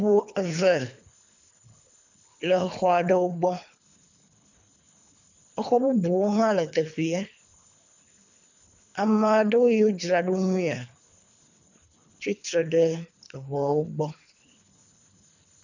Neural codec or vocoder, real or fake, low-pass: codec, 24 kHz, 3 kbps, HILCodec; fake; 7.2 kHz